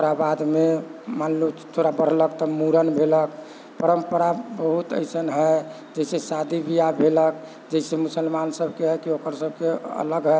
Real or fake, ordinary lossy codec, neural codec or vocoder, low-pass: real; none; none; none